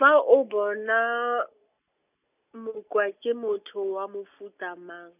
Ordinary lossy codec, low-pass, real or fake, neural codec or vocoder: none; 3.6 kHz; real; none